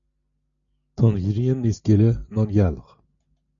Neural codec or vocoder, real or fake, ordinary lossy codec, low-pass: none; real; MP3, 96 kbps; 7.2 kHz